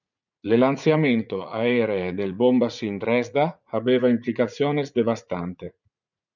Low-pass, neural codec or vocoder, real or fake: 7.2 kHz; vocoder, 44.1 kHz, 80 mel bands, Vocos; fake